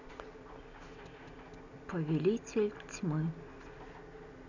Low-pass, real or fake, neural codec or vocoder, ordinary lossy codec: 7.2 kHz; real; none; none